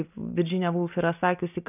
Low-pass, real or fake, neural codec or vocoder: 3.6 kHz; real; none